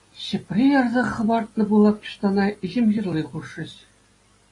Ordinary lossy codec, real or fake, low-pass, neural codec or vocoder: AAC, 32 kbps; real; 10.8 kHz; none